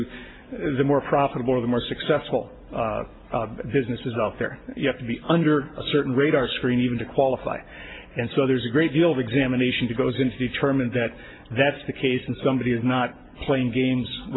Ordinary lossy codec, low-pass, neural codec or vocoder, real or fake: AAC, 16 kbps; 7.2 kHz; none; real